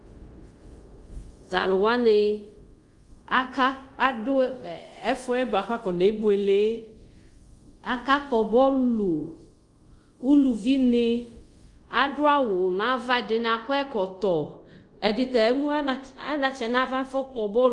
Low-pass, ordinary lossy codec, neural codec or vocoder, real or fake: 10.8 kHz; Opus, 32 kbps; codec, 24 kHz, 0.5 kbps, DualCodec; fake